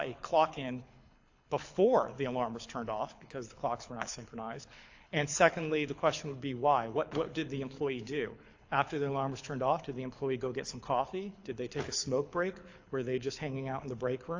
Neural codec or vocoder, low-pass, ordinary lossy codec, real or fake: codec, 24 kHz, 6 kbps, HILCodec; 7.2 kHz; AAC, 48 kbps; fake